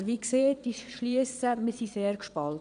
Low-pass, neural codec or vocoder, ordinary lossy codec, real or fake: 9.9 kHz; vocoder, 22.05 kHz, 80 mel bands, Vocos; none; fake